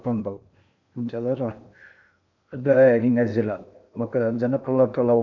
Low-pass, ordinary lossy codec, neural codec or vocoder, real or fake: 7.2 kHz; MP3, 64 kbps; codec, 16 kHz in and 24 kHz out, 0.8 kbps, FocalCodec, streaming, 65536 codes; fake